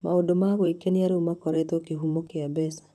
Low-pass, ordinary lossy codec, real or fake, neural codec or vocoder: 14.4 kHz; none; fake; vocoder, 44.1 kHz, 128 mel bands, Pupu-Vocoder